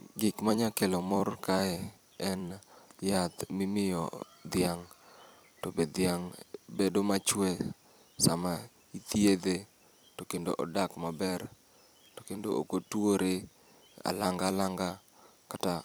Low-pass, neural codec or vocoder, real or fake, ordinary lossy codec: none; vocoder, 44.1 kHz, 128 mel bands every 256 samples, BigVGAN v2; fake; none